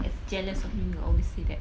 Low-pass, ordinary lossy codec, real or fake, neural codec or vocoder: none; none; real; none